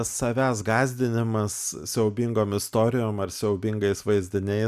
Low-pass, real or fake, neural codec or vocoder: 14.4 kHz; real; none